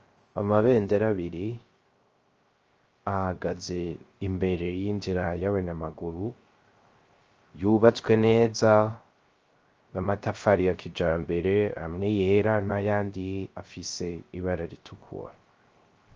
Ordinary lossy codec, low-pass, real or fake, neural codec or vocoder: Opus, 24 kbps; 7.2 kHz; fake; codec, 16 kHz, 0.3 kbps, FocalCodec